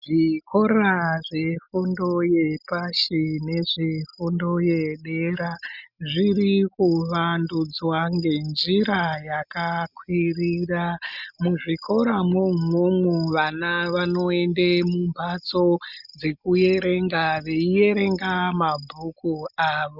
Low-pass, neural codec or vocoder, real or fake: 5.4 kHz; none; real